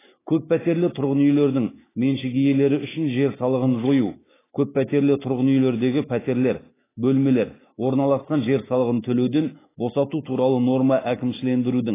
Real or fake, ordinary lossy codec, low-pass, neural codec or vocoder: fake; AAC, 16 kbps; 3.6 kHz; autoencoder, 48 kHz, 128 numbers a frame, DAC-VAE, trained on Japanese speech